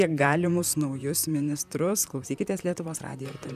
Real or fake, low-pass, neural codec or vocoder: fake; 14.4 kHz; vocoder, 44.1 kHz, 128 mel bands, Pupu-Vocoder